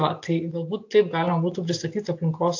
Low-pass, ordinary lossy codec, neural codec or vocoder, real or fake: 7.2 kHz; AAC, 48 kbps; none; real